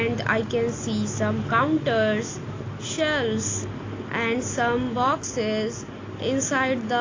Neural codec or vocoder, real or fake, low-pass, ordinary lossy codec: none; real; 7.2 kHz; AAC, 32 kbps